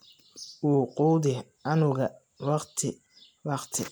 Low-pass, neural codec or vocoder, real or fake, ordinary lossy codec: none; none; real; none